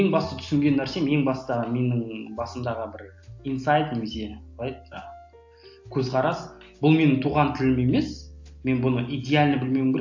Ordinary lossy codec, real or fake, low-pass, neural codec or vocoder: AAC, 48 kbps; real; 7.2 kHz; none